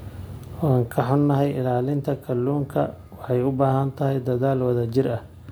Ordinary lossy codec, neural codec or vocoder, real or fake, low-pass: none; none; real; none